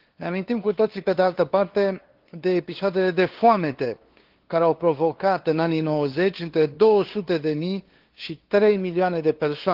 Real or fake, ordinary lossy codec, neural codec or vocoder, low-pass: fake; Opus, 16 kbps; codec, 16 kHz, 2 kbps, FunCodec, trained on LibriTTS, 25 frames a second; 5.4 kHz